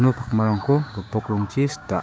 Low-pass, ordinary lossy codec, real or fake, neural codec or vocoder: none; none; fake; codec, 16 kHz, 6 kbps, DAC